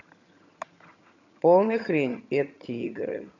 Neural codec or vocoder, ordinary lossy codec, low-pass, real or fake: vocoder, 22.05 kHz, 80 mel bands, HiFi-GAN; none; 7.2 kHz; fake